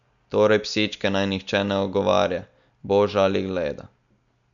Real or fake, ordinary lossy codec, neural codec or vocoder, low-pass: real; none; none; 7.2 kHz